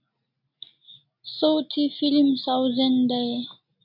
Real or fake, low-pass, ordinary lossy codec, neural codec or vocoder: real; 5.4 kHz; AAC, 48 kbps; none